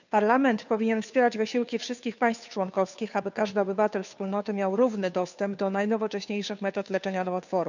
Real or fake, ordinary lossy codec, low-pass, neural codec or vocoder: fake; none; 7.2 kHz; codec, 16 kHz, 2 kbps, FunCodec, trained on Chinese and English, 25 frames a second